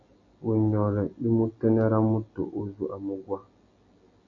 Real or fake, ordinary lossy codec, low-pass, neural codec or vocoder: real; AAC, 64 kbps; 7.2 kHz; none